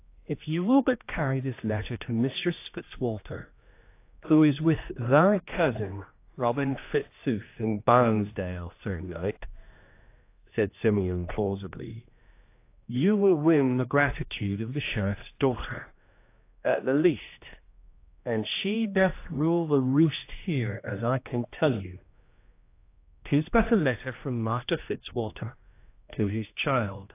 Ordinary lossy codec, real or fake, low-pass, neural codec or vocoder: AAC, 24 kbps; fake; 3.6 kHz; codec, 16 kHz, 1 kbps, X-Codec, HuBERT features, trained on general audio